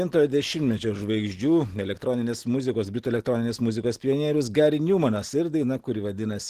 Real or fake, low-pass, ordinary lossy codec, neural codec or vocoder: real; 14.4 kHz; Opus, 16 kbps; none